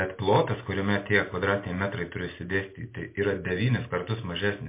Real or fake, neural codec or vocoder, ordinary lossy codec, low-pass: real; none; MP3, 24 kbps; 3.6 kHz